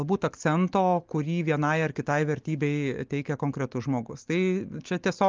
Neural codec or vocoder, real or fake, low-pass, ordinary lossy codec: none; real; 7.2 kHz; Opus, 32 kbps